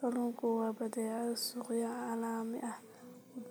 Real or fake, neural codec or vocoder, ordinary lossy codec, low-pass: real; none; none; none